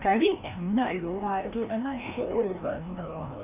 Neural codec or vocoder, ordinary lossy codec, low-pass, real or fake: codec, 16 kHz, 1 kbps, FreqCodec, larger model; none; 3.6 kHz; fake